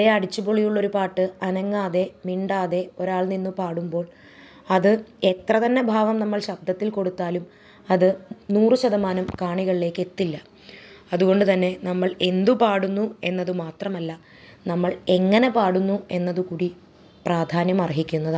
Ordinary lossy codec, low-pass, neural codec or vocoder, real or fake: none; none; none; real